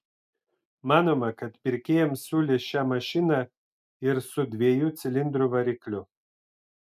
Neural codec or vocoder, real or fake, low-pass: none; real; 14.4 kHz